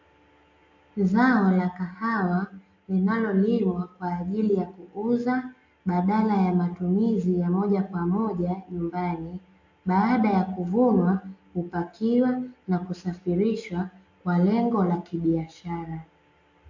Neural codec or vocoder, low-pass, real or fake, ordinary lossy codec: none; 7.2 kHz; real; AAC, 48 kbps